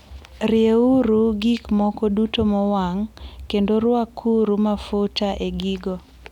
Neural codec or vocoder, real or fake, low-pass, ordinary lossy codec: none; real; 19.8 kHz; none